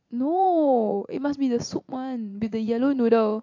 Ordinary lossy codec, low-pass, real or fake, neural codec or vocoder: AAC, 48 kbps; 7.2 kHz; fake; vocoder, 44.1 kHz, 80 mel bands, Vocos